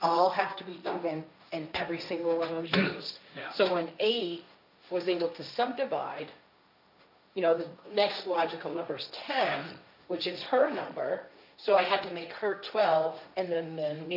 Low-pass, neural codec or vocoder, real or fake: 5.4 kHz; codec, 16 kHz, 1.1 kbps, Voila-Tokenizer; fake